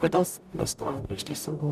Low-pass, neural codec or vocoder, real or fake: 14.4 kHz; codec, 44.1 kHz, 0.9 kbps, DAC; fake